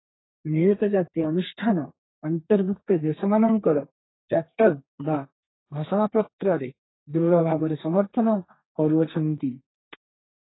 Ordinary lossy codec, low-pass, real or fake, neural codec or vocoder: AAC, 16 kbps; 7.2 kHz; fake; codec, 32 kHz, 1.9 kbps, SNAC